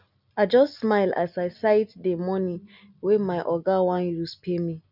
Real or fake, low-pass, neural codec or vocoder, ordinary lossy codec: real; 5.4 kHz; none; none